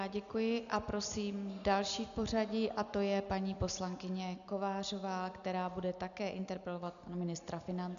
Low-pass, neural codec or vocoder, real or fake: 7.2 kHz; none; real